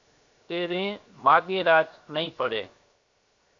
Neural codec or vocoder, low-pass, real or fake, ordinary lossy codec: codec, 16 kHz, 0.7 kbps, FocalCodec; 7.2 kHz; fake; AAC, 48 kbps